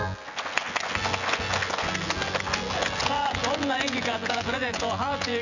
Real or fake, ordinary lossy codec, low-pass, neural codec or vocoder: fake; none; 7.2 kHz; vocoder, 24 kHz, 100 mel bands, Vocos